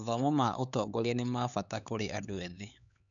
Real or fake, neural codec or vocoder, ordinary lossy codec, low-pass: fake; codec, 16 kHz, 4 kbps, X-Codec, HuBERT features, trained on LibriSpeech; none; 7.2 kHz